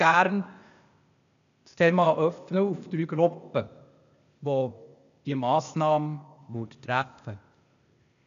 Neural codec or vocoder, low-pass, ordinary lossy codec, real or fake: codec, 16 kHz, 0.8 kbps, ZipCodec; 7.2 kHz; none; fake